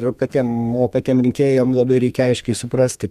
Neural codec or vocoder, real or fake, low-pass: codec, 32 kHz, 1.9 kbps, SNAC; fake; 14.4 kHz